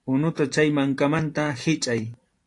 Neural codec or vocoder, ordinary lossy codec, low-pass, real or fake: vocoder, 44.1 kHz, 128 mel bands every 512 samples, BigVGAN v2; AAC, 48 kbps; 10.8 kHz; fake